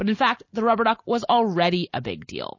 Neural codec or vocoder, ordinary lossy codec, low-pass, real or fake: none; MP3, 32 kbps; 7.2 kHz; real